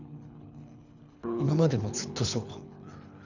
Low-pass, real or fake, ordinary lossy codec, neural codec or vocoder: 7.2 kHz; fake; none; codec, 24 kHz, 3 kbps, HILCodec